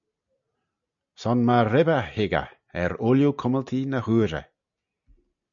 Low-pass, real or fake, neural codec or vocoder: 7.2 kHz; real; none